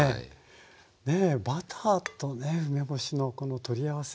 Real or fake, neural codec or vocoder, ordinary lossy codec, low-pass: real; none; none; none